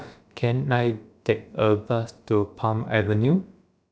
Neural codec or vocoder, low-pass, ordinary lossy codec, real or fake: codec, 16 kHz, about 1 kbps, DyCAST, with the encoder's durations; none; none; fake